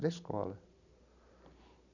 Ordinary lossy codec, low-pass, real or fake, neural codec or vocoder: none; 7.2 kHz; real; none